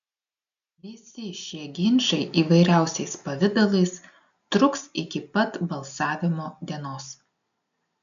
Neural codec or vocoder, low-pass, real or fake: none; 7.2 kHz; real